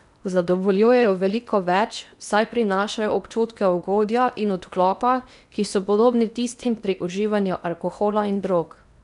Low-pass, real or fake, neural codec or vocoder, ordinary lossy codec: 10.8 kHz; fake; codec, 16 kHz in and 24 kHz out, 0.8 kbps, FocalCodec, streaming, 65536 codes; none